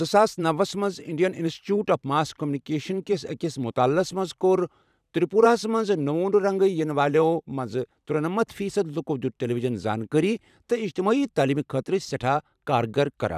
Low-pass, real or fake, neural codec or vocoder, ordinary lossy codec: 14.4 kHz; fake; vocoder, 44.1 kHz, 128 mel bands every 512 samples, BigVGAN v2; none